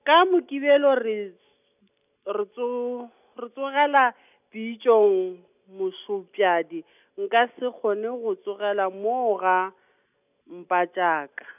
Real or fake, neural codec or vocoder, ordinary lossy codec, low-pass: real; none; none; 3.6 kHz